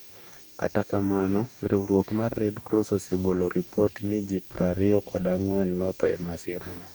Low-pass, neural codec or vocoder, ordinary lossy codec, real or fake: none; codec, 44.1 kHz, 2.6 kbps, DAC; none; fake